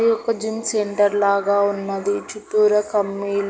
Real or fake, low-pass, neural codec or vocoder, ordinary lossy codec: real; none; none; none